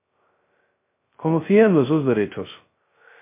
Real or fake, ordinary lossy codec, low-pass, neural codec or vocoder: fake; MP3, 24 kbps; 3.6 kHz; codec, 16 kHz, 0.2 kbps, FocalCodec